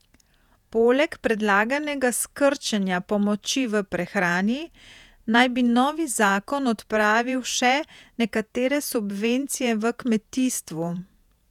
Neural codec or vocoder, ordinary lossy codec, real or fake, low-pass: vocoder, 48 kHz, 128 mel bands, Vocos; none; fake; 19.8 kHz